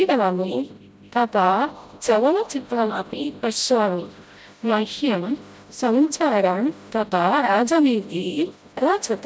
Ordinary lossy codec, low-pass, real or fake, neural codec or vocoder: none; none; fake; codec, 16 kHz, 0.5 kbps, FreqCodec, smaller model